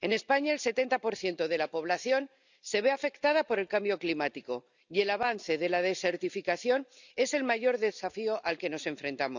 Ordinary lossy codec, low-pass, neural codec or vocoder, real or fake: none; 7.2 kHz; none; real